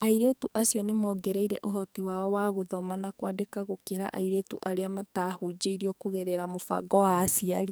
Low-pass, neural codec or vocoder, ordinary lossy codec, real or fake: none; codec, 44.1 kHz, 2.6 kbps, SNAC; none; fake